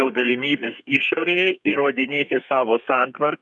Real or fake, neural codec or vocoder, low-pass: fake; codec, 32 kHz, 1.9 kbps, SNAC; 10.8 kHz